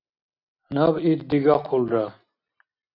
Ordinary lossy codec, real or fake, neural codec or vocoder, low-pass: AAC, 32 kbps; real; none; 5.4 kHz